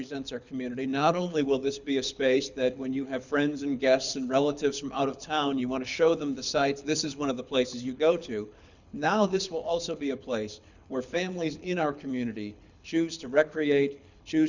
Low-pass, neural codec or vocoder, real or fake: 7.2 kHz; codec, 24 kHz, 6 kbps, HILCodec; fake